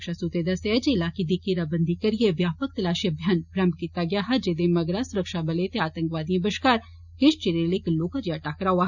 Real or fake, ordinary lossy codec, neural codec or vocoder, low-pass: real; none; none; 7.2 kHz